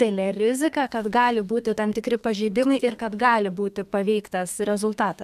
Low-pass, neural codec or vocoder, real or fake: 14.4 kHz; codec, 32 kHz, 1.9 kbps, SNAC; fake